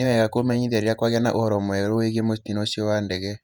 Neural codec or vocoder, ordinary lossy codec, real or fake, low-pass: vocoder, 48 kHz, 128 mel bands, Vocos; none; fake; 19.8 kHz